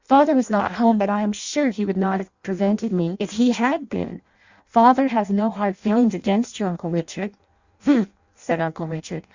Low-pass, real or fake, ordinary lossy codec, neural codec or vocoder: 7.2 kHz; fake; Opus, 64 kbps; codec, 16 kHz in and 24 kHz out, 0.6 kbps, FireRedTTS-2 codec